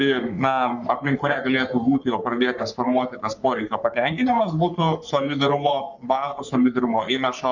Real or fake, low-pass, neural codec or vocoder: fake; 7.2 kHz; codec, 44.1 kHz, 3.4 kbps, Pupu-Codec